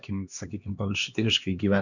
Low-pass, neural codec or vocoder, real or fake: 7.2 kHz; codec, 16 kHz, 2 kbps, X-Codec, HuBERT features, trained on LibriSpeech; fake